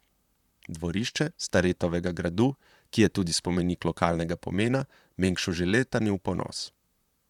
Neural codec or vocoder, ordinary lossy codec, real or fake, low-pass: vocoder, 44.1 kHz, 128 mel bands, Pupu-Vocoder; none; fake; 19.8 kHz